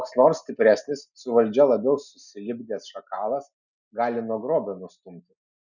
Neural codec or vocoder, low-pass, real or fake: none; 7.2 kHz; real